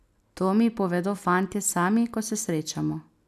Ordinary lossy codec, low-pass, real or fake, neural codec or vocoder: none; 14.4 kHz; real; none